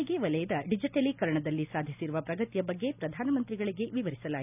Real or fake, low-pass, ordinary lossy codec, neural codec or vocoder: real; 3.6 kHz; none; none